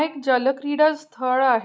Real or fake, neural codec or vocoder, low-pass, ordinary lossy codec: real; none; none; none